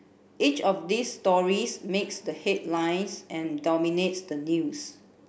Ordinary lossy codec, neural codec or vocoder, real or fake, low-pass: none; none; real; none